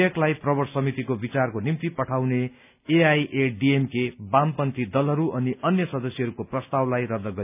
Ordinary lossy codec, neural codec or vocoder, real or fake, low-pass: none; none; real; 3.6 kHz